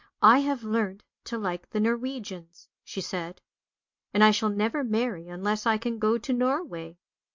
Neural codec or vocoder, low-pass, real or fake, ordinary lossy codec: none; 7.2 kHz; real; MP3, 48 kbps